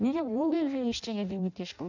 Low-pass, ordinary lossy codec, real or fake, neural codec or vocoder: 7.2 kHz; none; fake; codec, 16 kHz in and 24 kHz out, 0.6 kbps, FireRedTTS-2 codec